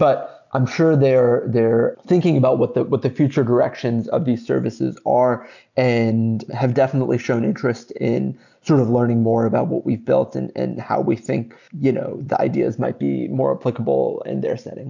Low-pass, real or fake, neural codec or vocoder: 7.2 kHz; real; none